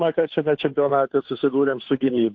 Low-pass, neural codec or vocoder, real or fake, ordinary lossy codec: 7.2 kHz; codec, 16 kHz, 2 kbps, FunCodec, trained on Chinese and English, 25 frames a second; fake; AAC, 48 kbps